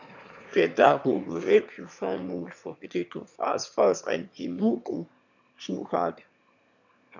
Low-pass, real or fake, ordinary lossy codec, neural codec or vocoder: 7.2 kHz; fake; none; autoencoder, 22.05 kHz, a latent of 192 numbers a frame, VITS, trained on one speaker